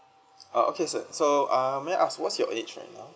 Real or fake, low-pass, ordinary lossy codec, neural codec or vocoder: real; none; none; none